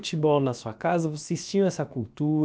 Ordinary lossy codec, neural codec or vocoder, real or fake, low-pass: none; codec, 16 kHz, about 1 kbps, DyCAST, with the encoder's durations; fake; none